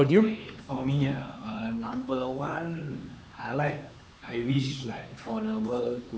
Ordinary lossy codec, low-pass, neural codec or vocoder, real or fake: none; none; codec, 16 kHz, 4 kbps, X-Codec, HuBERT features, trained on LibriSpeech; fake